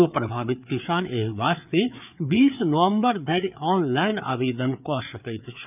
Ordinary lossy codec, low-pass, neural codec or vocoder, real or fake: none; 3.6 kHz; codec, 16 kHz, 4 kbps, FreqCodec, larger model; fake